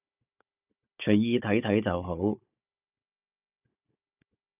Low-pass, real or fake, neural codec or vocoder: 3.6 kHz; fake; codec, 16 kHz, 16 kbps, FunCodec, trained on Chinese and English, 50 frames a second